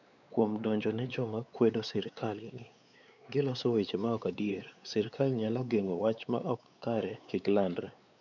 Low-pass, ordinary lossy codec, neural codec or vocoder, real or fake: 7.2 kHz; none; codec, 16 kHz, 4 kbps, X-Codec, WavLM features, trained on Multilingual LibriSpeech; fake